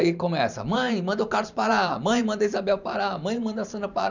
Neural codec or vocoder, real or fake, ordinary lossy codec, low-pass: vocoder, 44.1 kHz, 128 mel bands every 512 samples, BigVGAN v2; fake; none; 7.2 kHz